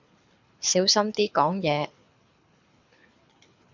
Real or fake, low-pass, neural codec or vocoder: fake; 7.2 kHz; codec, 24 kHz, 6 kbps, HILCodec